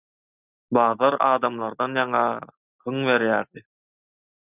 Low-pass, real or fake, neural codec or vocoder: 3.6 kHz; real; none